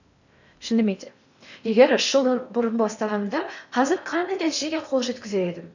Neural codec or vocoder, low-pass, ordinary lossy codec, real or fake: codec, 16 kHz in and 24 kHz out, 0.8 kbps, FocalCodec, streaming, 65536 codes; 7.2 kHz; none; fake